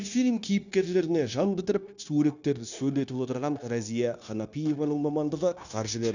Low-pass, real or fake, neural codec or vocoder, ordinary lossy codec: 7.2 kHz; fake; codec, 16 kHz, 0.9 kbps, LongCat-Audio-Codec; none